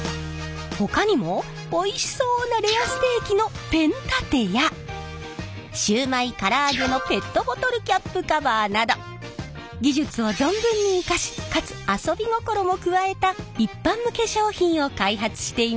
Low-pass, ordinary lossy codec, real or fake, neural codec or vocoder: none; none; real; none